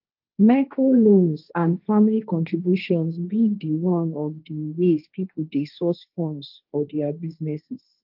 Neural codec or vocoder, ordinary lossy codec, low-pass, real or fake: codec, 16 kHz, 1.1 kbps, Voila-Tokenizer; Opus, 32 kbps; 5.4 kHz; fake